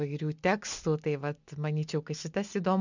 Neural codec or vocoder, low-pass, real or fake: none; 7.2 kHz; real